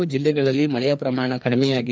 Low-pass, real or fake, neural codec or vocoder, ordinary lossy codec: none; fake; codec, 16 kHz, 2 kbps, FreqCodec, larger model; none